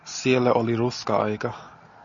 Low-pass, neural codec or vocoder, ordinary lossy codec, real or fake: 7.2 kHz; none; AAC, 64 kbps; real